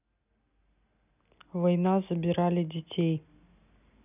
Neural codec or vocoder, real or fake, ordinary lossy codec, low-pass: none; real; none; 3.6 kHz